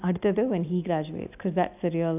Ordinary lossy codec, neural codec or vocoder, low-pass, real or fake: AAC, 32 kbps; none; 3.6 kHz; real